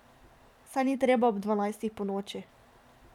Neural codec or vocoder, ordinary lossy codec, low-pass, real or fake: vocoder, 44.1 kHz, 128 mel bands every 512 samples, BigVGAN v2; none; 19.8 kHz; fake